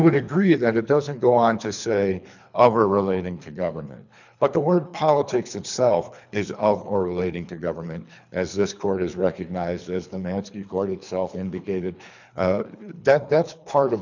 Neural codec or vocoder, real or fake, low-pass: codec, 24 kHz, 3 kbps, HILCodec; fake; 7.2 kHz